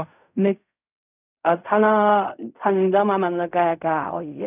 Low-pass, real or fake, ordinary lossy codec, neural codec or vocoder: 3.6 kHz; fake; none; codec, 16 kHz in and 24 kHz out, 0.4 kbps, LongCat-Audio-Codec, fine tuned four codebook decoder